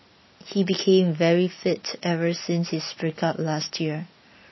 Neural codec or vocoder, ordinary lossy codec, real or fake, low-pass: none; MP3, 24 kbps; real; 7.2 kHz